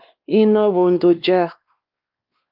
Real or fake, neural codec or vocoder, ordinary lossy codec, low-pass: fake; codec, 16 kHz, 2 kbps, X-Codec, WavLM features, trained on Multilingual LibriSpeech; Opus, 24 kbps; 5.4 kHz